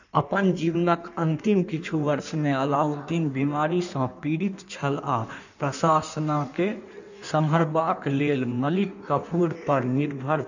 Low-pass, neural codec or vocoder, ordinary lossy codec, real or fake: 7.2 kHz; codec, 16 kHz in and 24 kHz out, 1.1 kbps, FireRedTTS-2 codec; none; fake